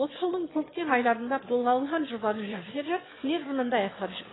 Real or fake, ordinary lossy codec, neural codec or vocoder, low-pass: fake; AAC, 16 kbps; autoencoder, 22.05 kHz, a latent of 192 numbers a frame, VITS, trained on one speaker; 7.2 kHz